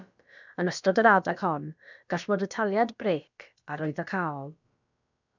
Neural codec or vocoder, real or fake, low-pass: codec, 16 kHz, about 1 kbps, DyCAST, with the encoder's durations; fake; 7.2 kHz